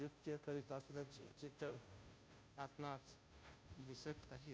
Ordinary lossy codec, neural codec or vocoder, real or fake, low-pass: none; codec, 16 kHz, 0.5 kbps, FunCodec, trained on Chinese and English, 25 frames a second; fake; none